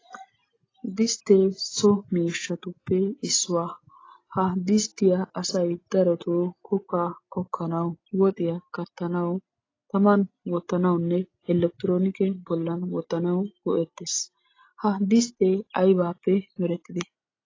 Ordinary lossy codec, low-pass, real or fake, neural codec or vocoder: AAC, 32 kbps; 7.2 kHz; real; none